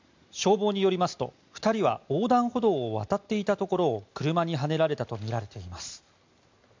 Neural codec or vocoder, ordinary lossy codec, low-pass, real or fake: none; MP3, 64 kbps; 7.2 kHz; real